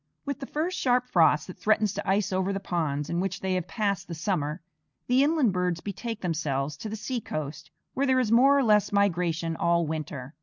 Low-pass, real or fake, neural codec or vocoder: 7.2 kHz; real; none